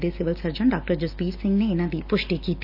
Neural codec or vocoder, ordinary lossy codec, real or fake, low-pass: none; none; real; 5.4 kHz